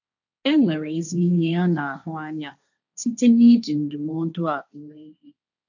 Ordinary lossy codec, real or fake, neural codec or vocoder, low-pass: none; fake; codec, 16 kHz, 1.1 kbps, Voila-Tokenizer; 7.2 kHz